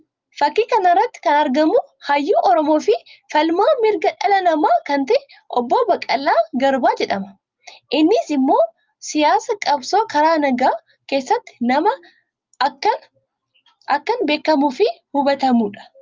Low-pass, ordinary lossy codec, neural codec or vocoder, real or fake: 7.2 kHz; Opus, 32 kbps; none; real